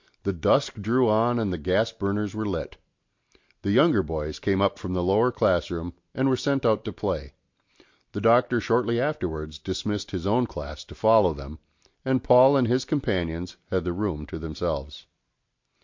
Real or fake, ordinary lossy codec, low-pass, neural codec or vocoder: real; MP3, 48 kbps; 7.2 kHz; none